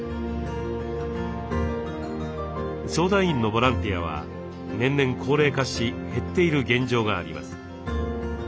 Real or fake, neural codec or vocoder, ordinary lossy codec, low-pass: real; none; none; none